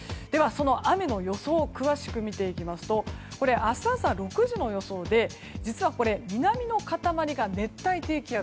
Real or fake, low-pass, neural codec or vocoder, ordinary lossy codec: real; none; none; none